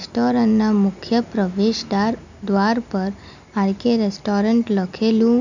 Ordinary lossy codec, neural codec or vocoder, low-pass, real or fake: none; none; 7.2 kHz; real